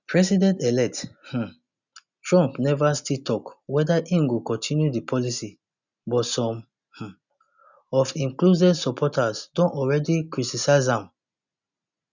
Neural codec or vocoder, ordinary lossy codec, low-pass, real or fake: none; none; 7.2 kHz; real